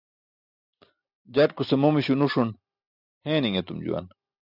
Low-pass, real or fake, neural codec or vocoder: 5.4 kHz; real; none